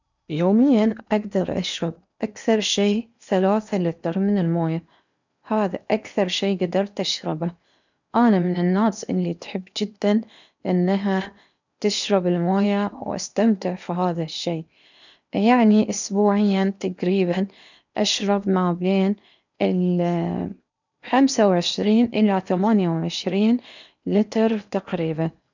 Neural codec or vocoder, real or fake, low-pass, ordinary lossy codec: codec, 16 kHz in and 24 kHz out, 0.8 kbps, FocalCodec, streaming, 65536 codes; fake; 7.2 kHz; none